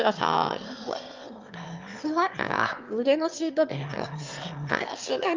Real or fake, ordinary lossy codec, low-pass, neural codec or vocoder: fake; Opus, 24 kbps; 7.2 kHz; autoencoder, 22.05 kHz, a latent of 192 numbers a frame, VITS, trained on one speaker